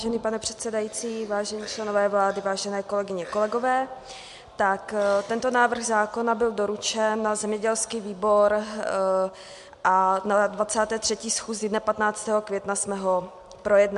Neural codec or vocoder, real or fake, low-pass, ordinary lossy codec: none; real; 10.8 kHz; MP3, 64 kbps